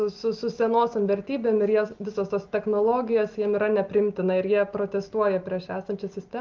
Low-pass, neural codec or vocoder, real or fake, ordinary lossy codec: 7.2 kHz; none; real; Opus, 24 kbps